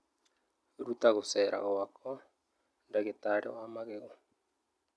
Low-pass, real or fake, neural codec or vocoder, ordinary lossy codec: none; real; none; none